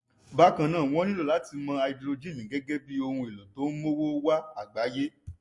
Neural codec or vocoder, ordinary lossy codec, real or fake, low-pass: none; MP3, 48 kbps; real; 10.8 kHz